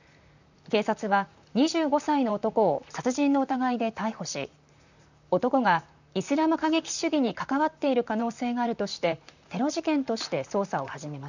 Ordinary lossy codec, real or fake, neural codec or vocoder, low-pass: none; fake; vocoder, 44.1 kHz, 128 mel bands, Pupu-Vocoder; 7.2 kHz